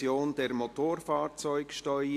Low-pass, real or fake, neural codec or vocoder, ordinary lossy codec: 14.4 kHz; real; none; AAC, 64 kbps